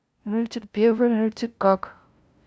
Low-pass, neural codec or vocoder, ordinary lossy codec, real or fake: none; codec, 16 kHz, 0.5 kbps, FunCodec, trained on LibriTTS, 25 frames a second; none; fake